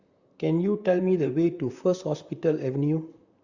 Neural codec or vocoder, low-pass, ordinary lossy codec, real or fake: vocoder, 44.1 kHz, 128 mel bands, Pupu-Vocoder; 7.2 kHz; Opus, 64 kbps; fake